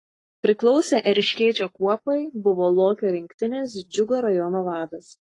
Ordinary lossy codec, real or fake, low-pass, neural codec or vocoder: AAC, 32 kbps; fake; 10.8 kHz; codec, 44.1 kHz, 7.8 kbps, Pupu-Codec